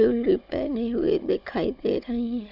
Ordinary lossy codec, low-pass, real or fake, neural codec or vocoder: none; 5.4 kHz; fake; codec, 16 kHz, 16 kbps, FunCodec, trained on Chinese and English, 50 frames a second